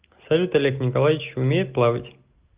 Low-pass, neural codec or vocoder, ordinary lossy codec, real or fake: 3.6 kHz; none; Opus, 32 kbps; real